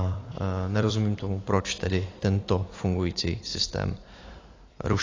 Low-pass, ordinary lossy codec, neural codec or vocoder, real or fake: 7.2 kHz; AAC, 32 kbps; vocoder, 44.1 kHz, 128 mel bands every 256 samples, BigVGAN v2; fake